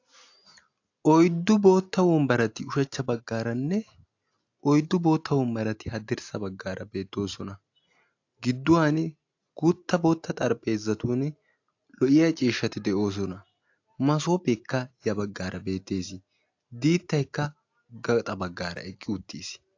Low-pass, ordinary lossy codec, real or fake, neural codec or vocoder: 7.2 kHz; AAC, 48 kbps; real; none